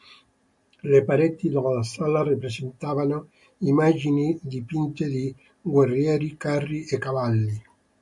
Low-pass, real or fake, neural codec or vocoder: 10.8 kHz; real; none